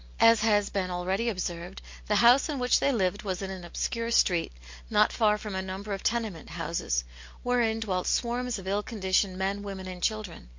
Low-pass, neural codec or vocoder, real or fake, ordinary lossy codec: 7.2 kHz; none; real; MP3, 48 kbps